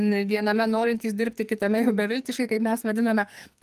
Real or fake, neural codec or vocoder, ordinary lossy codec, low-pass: fake; codec, 44.1 kHz, 2.6 kbps, SNAC; Opus, 32 kbps; 14.4 kHz